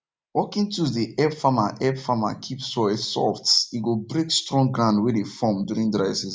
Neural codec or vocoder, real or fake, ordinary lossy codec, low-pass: none; real; none; none